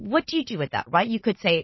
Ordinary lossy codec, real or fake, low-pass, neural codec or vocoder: MP3, 24 kbps; real; 7.2 kHz; none